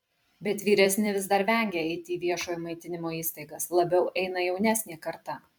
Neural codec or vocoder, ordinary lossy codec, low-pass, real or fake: vocoder, 44.1 kHz, 128 mel bands every 256 samples, BigVGAN v2; MP3, 96 kbps; 19.8 kHz; fake